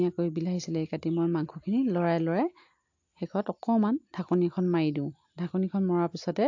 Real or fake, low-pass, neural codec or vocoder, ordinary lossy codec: real; 7.2 kHz; none; AAC, 48 kbps